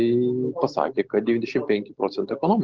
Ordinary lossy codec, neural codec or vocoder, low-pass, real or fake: Opus, 16 kbps; none; 7.2 kHz; real